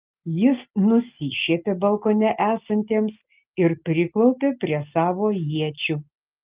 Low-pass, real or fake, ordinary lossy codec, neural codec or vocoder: 3.6 kHz; real; Opus, 24 kbps; none